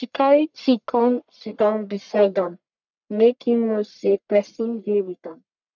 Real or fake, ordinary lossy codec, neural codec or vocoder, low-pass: fake; none; codec, 44.1 kHz, 1.7 kbps, Pupu-Codec; 7.2 kHz